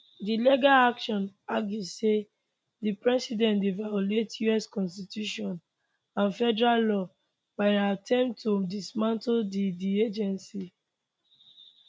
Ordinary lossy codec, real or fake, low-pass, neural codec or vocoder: none; real; none; none